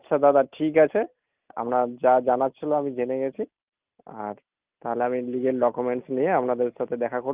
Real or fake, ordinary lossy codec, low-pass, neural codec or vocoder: real; Opus, 24 kbps; 3.6 kHz; none